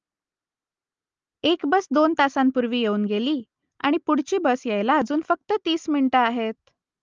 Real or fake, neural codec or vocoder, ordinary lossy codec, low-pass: real; none; Opus, 24 kbps; 7.2 kHz